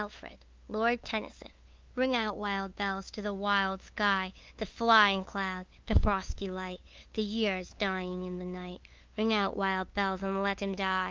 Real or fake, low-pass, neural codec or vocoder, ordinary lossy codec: fake; 7.2 kHz; codec, 16 kHz, 2 kbps, FunCodec, trained on LibriTTS, 25 frames a second; Opus, 24 kbps